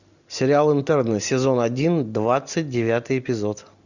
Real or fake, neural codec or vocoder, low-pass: real; none; 7.2 kHz